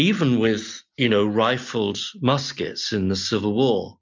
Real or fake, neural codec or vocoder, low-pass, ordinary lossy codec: real; none; 7.2 kHz; MP3, 64 kbps